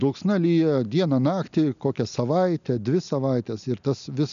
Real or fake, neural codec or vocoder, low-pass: real; none; 7.2 kHz